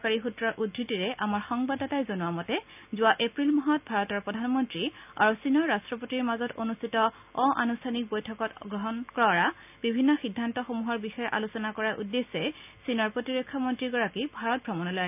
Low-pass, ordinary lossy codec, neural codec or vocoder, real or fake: 3.6 kHz; none; none; real